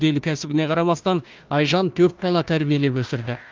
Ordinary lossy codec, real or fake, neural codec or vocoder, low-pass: Opus, 32 kbps; fake; codec, 16 kHz, 1 kbps, FunCodec, trained on Chinese and English, 50 frames a second; 7.2 kHz